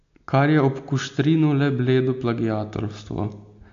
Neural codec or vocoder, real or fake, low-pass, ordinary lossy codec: none; real; 7.2 kHz; AAC, 64 kbps